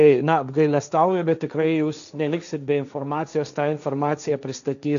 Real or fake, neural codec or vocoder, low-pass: fake; codec, 16 kHz, 1.1 kbps, Voila-Tokenizer; 7.2 kHz